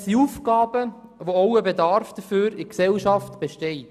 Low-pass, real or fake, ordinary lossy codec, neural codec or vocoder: 14.4 kHz; real; none; none